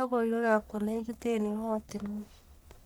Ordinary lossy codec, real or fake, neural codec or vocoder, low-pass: none; fake; codec, 44.1 kHz, 1.7 kbps, Pupu-Codec; none